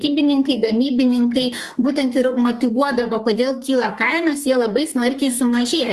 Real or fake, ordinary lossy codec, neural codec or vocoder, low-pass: fake; Opus, 32 kbps; codec, 32 kHz, 1.9 kbps, SNAC; 14.4 kHz